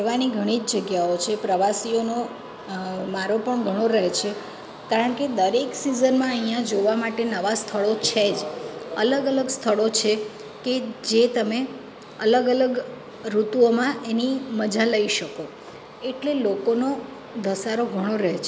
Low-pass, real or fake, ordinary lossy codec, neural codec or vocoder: none; real; none; none